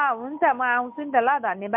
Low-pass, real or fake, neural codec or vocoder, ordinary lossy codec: 3.6 kHz; real; none; MP3, 32 kbps